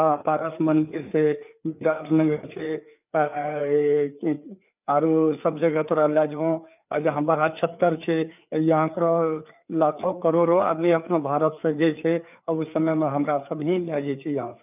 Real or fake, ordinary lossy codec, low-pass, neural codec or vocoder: fake; none; 3.6 kHz; codec, 16 kHz, 4 kbps, FreqCodec, larger model